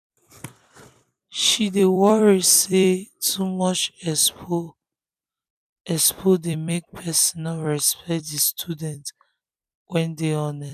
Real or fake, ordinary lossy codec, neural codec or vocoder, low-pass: fake; none; vocoder, 44.1 kHz, 128 mel bands every 256 samples, BigVGAN v2; 14.4 kHz